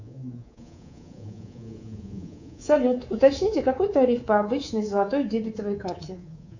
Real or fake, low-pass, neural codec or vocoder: fake; 7.2 kHz; codec, 24 kHz, 3.1 kbps, DualCodec